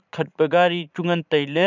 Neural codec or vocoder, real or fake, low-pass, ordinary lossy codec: vocoder, 44.1 kHz, 80 mel bands, Vocos; fake; 7.2 kHz; none